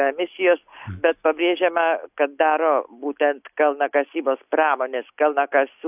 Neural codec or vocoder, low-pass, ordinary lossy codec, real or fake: none; 3.6 kHz; AAC, 32 kbps; real